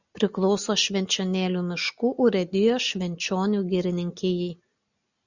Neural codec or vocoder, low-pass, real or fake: none; 7.2 kHz; real